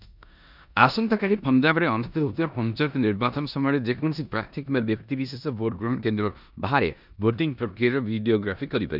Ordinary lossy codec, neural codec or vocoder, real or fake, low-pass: none; codec, 16 kHz in and 24 kHz out, 0.9 kbps, LongCat-Audio-Codec, four codebook decoder; fake; 5.4 kHz